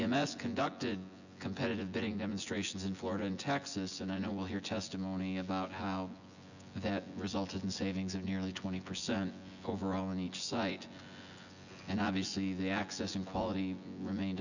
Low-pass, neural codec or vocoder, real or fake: 7.2 kHz; vocoder, 24 kHz, 100 mel bands, Vocos; fake